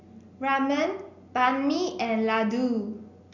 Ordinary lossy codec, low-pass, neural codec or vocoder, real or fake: none; 7.2 kHz; none; real